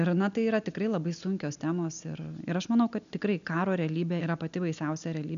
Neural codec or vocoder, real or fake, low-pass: none; real; 7.2 kHz